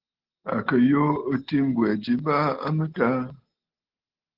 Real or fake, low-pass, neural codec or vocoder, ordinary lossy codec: real; 5.4 kHz; none; Opus, 16 kbps